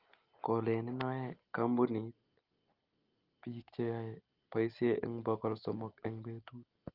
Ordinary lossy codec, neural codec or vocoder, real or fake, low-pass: Opus, 32 kbps; none; real; 5.4 kHz